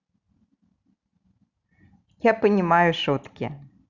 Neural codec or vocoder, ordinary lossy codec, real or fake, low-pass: none; Opus, 64 kbps; real; 7.2 kHz